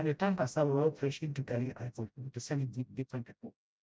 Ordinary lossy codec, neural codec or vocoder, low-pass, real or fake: none; codec, 16 kHz, 0.5 kbps, FreqCodec, smaller model; none; fake